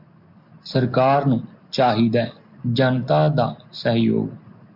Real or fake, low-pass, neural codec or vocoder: real; 5.4 kHz; none